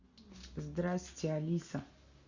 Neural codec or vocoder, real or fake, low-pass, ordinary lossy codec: none; real; 7.2 kHz; Opus, 64 kbps